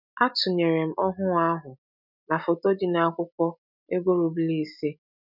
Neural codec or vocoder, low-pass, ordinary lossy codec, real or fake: none; 5.4 kHz; none; real